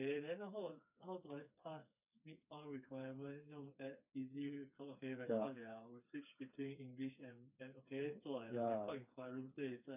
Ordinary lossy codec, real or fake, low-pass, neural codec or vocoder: none; fake; 3.6 kHz; codec, 16 kHz, 4 kbps, FreqCodec, smaller model